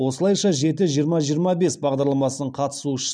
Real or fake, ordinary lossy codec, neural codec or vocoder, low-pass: real; none; none; 9.9 kHz